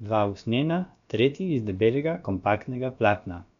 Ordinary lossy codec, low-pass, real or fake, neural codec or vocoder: none; 7.2 kHz; fake; codec, 16 kHz, about 1 kbps, DyCAST, with the encoder's durations